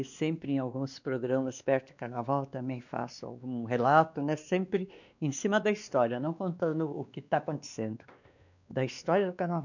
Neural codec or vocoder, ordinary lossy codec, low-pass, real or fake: codec, 16 kHz, 2 kbps, X-Codec, WavLM features, trained on Multilingual LibriSpeech; none; 7.2 kHz; fake